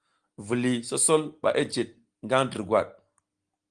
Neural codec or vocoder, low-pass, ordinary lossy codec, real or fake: none; 9.9 kHz; Opus, 24 kbps; real